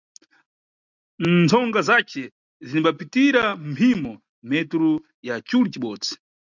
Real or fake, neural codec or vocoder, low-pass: real; none; 7.2 kHz